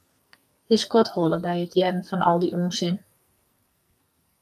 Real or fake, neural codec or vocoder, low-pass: fake; codec, 44.1 kHz, 2.6 kbps, SNAC; 14.4 kHz